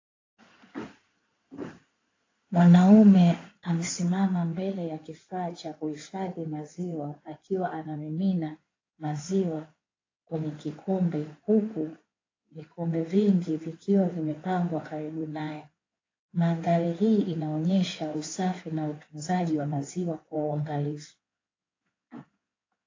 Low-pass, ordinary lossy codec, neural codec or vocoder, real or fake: 7.2 kHz; AAC, 32 kbps; codec, 16 kHz in and 24 kHz out, 2.2 kbps, FireRedTTS-2 codec; fake